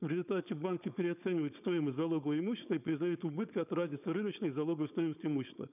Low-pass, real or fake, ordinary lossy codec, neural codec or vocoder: 3.6 kHz; fake; none; codec, 16 kHz, 4.8 kbps, FACodec